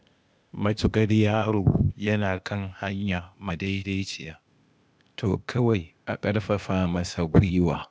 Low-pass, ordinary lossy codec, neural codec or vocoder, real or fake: none; none; codec, 16 kHz, 0.8 kbps, ZipCodec; fake